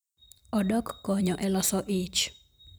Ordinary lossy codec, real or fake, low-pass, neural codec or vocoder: none; fake; none; vocoder, 44.1 kHz, 128 mel bands every 256 samples, BigVGAN v2